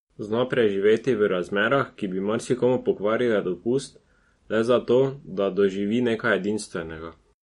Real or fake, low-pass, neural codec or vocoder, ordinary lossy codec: real; 19.8 kHz; none; MP3, 48 kbps